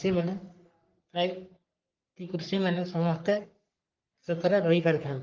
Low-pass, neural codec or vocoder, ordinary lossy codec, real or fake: 7.2 kHz; codec, 44.1 kHz, 3.4 kbps, Pupu-Codec; Opus, 32 kbps; fake